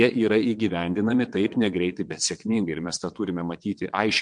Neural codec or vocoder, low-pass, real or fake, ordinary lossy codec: vocoder, 22.05 kHz, 80 mel bands, WaveNeXt; 9.9 kHz; fake; MP3, 64 kbps